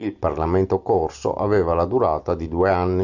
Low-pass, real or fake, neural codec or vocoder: 7.2 kHz; real; none